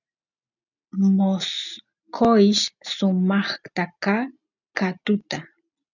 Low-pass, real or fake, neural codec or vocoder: 7.2 kHz; real; none